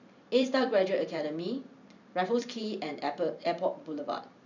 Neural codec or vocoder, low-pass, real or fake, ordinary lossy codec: vocoder, 44.1 kHz, 128 mel bands every 512 samples, BigVGAN v2; 7.2 kHz; fake; none